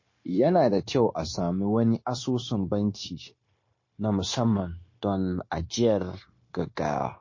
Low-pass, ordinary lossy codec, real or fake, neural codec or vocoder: 7.2 kHz; MP3, 32 kbps; fake; codec, 16 kHz, 0.9 kbps, LongCat-Audio-Codec